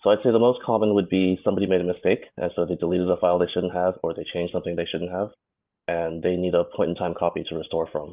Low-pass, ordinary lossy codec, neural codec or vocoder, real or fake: 3.6 kHz; Opus, 24 kbps; vocoder, 44.1 kHz, 128 mel bands every 512 samples, BigVGAN v2; fake